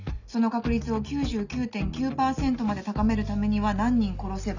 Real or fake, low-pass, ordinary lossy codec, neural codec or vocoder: real; 7.2 kHz; none; none